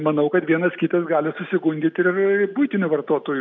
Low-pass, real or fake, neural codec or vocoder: 7.2 kHz; real; none